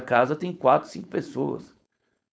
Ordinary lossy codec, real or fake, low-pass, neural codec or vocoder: none; fake; none; codec, 16 kHz, 4.8 kbps, FACodec